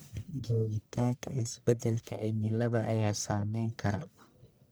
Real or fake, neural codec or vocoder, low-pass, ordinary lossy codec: fake; codec, 44.1 kHz, 1.7 kbps, Pupu-Codec; none; none